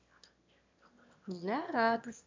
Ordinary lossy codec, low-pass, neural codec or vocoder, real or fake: MP3, 64 kbps; 7.2 kHz; autoencoder, 22.05 kHz, a latent of 192 numbers a frame, VITS, trained on one speaker; fake